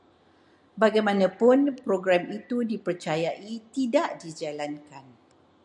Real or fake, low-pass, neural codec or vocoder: real; 10.8 kHz; none